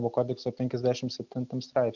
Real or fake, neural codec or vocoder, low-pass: real; none; 7.2 kHz